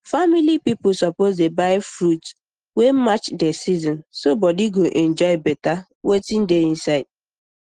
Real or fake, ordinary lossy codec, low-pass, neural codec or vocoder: real; Opus, 16 kbps; 9.9 kHz; none